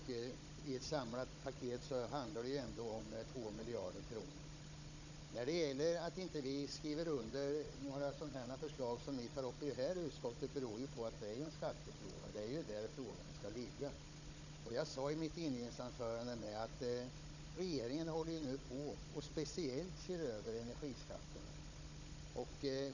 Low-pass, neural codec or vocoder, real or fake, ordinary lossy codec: 7.2 kHz; codec, 16 kHz, 16 kbps, FunCodec, trained on Chinese and English, 50 frames a second; fake; none